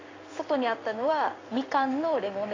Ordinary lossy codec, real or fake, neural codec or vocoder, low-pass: AAC, 32 kbps; fake; codec, 16 kHz in and 24 kHz out, 1 kbps, XY-Tokenizer; 7.2 kHz